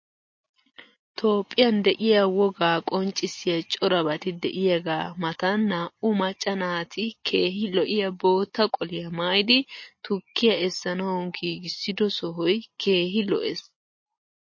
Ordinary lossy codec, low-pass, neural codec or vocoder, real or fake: MP3, 32 kbps; 7.2 kHz; none; real